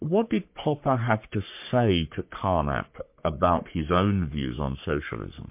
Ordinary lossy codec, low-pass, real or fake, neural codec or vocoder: MP3, 32 kbps; 3.6 kHz; fake; codec, 44.1 kHz, 3.4 kbps, Pupu-Codec